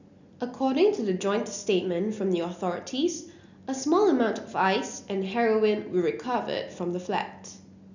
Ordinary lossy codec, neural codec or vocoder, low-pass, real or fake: none; none; 7.2 kHz; real